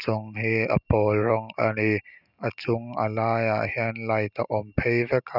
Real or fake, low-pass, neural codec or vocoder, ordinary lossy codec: real; 5.4 kHz; none; none